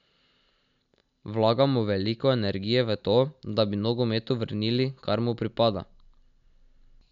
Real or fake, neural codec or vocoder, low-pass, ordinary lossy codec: real; none; 7.2 kHz; none